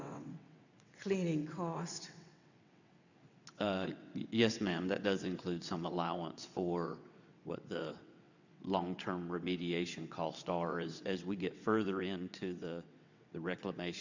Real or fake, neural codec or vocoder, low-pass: fake; vocoder, 22.05 kHz, 80 mel bands, WaveNeXt; 7.2 kHz